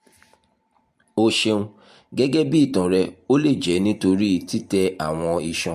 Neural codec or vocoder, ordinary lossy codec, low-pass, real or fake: vocoder, 44.1 kHz, 128 mel bands every 512 samples, BigVGAN v2; MP3, 64 kbps; 14.4 kHz; fake